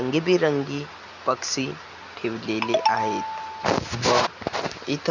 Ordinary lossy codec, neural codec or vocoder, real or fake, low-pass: none; none; real; 7.2 kHz